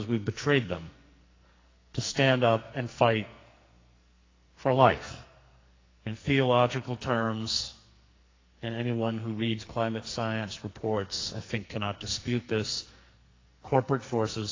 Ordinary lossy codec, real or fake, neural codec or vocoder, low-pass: AAC, 32 kbps; fake; codec, 44.1 kHz, 2.6 kbps, SNAC; 7.2 kHz